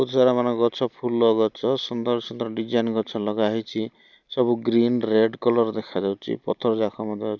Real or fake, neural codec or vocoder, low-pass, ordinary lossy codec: real; none; 7.2 kHz; none